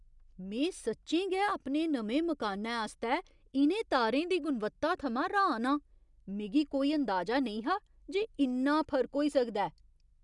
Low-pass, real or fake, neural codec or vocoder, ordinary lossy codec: 10.8 kHz; real; none; none